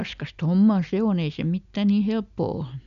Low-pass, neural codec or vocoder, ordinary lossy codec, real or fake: 7.2 kHz; none; none; real